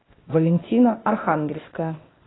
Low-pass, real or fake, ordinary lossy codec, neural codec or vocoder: 7.2 kHz; fake; AAC, 16 kbps; codec, 16 kHz, 0.8 kbps, ZipCodec